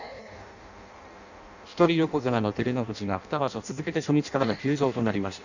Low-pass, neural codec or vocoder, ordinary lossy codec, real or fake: 7.2 kHz; codec, 16 kHz in and 24 kHz out, 0.6 kbps, FireRedTTS-2 codec; none; fake